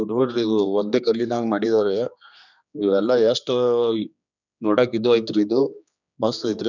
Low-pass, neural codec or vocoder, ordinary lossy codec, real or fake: 7.2 kHz; codec, 16 kHz, 2 kbps, X-Codec, HuBERT features, trained on general audio; none; fake